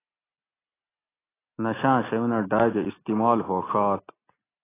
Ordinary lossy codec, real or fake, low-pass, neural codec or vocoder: AAC, 16 kbps; real; 3.6 kHz; none